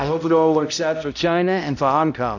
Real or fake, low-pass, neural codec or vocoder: fake; 7.2 kHz; codec, 16 kHz, 0.5 kbps, X-Codec, HuBERT features, trained on balanced general audio